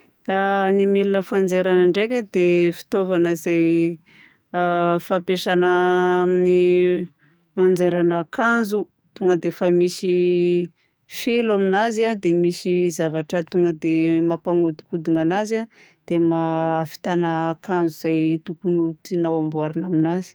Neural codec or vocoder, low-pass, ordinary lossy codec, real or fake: codec, 44.1 kHz, 2.6 kbps, SNAC; none; none; fake